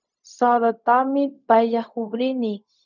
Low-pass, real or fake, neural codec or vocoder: 7.2 kHz; fake; codec, 16 kHz, 0.4 kbps, LongCat-Audio-Codec